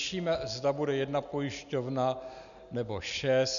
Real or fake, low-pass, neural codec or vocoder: real; 7.2 kHz; none